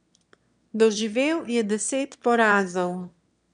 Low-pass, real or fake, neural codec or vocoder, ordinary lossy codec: 9.9 kHz; fake; autoencoder, 22.05 kHz, a latent of 192 numbers a frame, VITS, trained on one speaker; MP3, 96 kbps